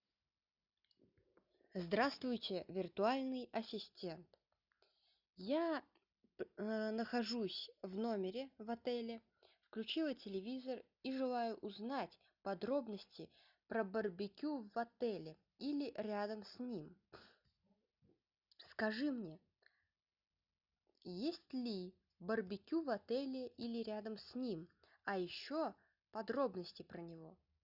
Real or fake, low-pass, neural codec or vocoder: real; 5.4 kHz; none